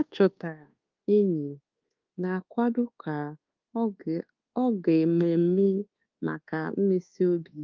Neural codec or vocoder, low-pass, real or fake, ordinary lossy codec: codec, 24 kHz, 1.2 kbps, DualCodec; 7.2 kHz; fake; Opus, 32 kbps